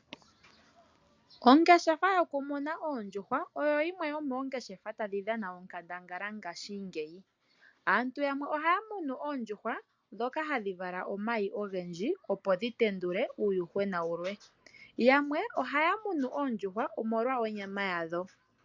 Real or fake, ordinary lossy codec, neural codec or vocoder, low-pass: real; MP3, 64 kbps; none; 7.2 kHz